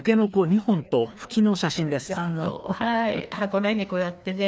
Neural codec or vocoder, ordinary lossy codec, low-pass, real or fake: codec, 16 kHz, 2 kbps, FreqCodec, larger model; none; none; fake